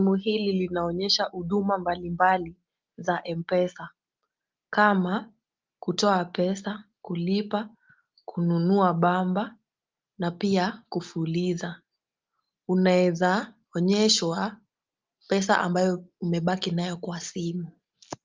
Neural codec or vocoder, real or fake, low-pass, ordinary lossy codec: none; real; 7.2 kHz; Opus, 32 kbps